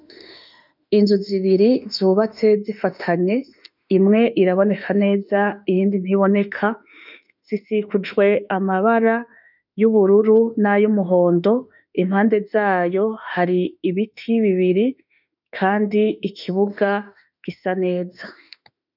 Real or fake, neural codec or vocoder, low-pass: fake; autoencoder, 48 kHz, 32 numbers a frame, DAC-VAE, trained on Japanese speech; 5.4 kHz